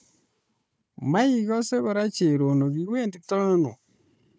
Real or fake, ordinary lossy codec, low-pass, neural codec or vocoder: fake; none; none; codec, 16 kHz, 4 kbps, FunCodec, trained on Chinese and English, 50 frames a second